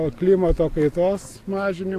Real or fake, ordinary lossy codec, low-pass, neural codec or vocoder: real; AAC, 64 kbps; 14.4 kHz; none